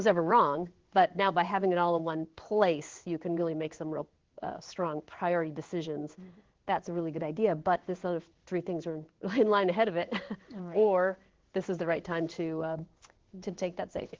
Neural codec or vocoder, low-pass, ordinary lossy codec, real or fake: codec, 16 kHz in and 24 kHz out, 1 kbps, XY-Tokenizer; 7.2 kHz; Opus, 16 kbps; fake